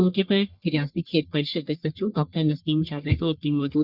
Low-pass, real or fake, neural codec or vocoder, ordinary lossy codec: 5.4 kHz; fake; codec, 44.1 kHz, 1.7 kbps, Pupu-Codec; Opus, 64 kbps